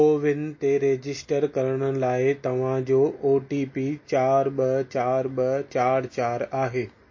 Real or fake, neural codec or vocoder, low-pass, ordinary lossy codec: real; none; 7.2 kHz; MP3, 32 kbps